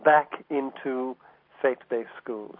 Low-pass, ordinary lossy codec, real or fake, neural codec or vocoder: 5.4 kHz; MP3, 32 kbps; real; none